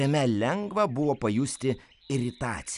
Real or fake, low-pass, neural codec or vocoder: real; 10.8 kHz; none